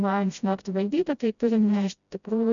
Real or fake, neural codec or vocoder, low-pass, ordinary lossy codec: fake; codec, 16 kHz, 0.5 kbps, FreqCodec, smaller model; 7.2 kHz; MP3, 96 kbps